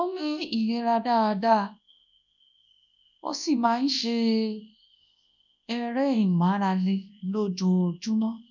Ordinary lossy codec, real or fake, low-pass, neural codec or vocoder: none; fake; 7.2 kHz; codec, 24 kHz, 0.9 kbps, WavTokenizer, large speech release